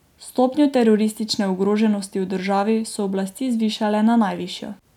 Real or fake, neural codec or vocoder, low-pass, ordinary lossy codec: real; none; 19.8 kHz; none